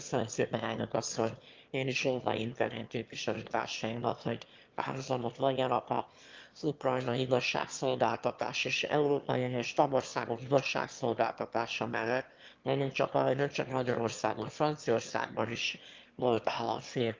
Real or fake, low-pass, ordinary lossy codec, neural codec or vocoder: fake; 7.2 kHz; Opus, 32 kbps; autoencoder, 22.05 kHz, a latent of 192 numbers a frame, VITS, trained on one speaker